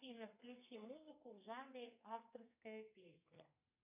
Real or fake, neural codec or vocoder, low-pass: fake; codec, 32 kHz, 1.9 kbps, SNAC; 3.6 kHz